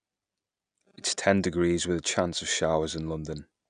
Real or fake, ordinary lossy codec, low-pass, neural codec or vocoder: real; none; 9.9 kHz; none